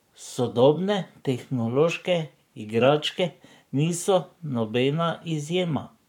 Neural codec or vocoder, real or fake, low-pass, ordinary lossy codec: codec, 44.1 kHz, 7.8 kbps, Pupu-Codec; fake; 19.8 kHz; none